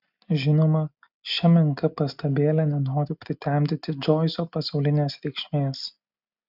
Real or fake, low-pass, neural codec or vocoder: real; 5.4 kHz; none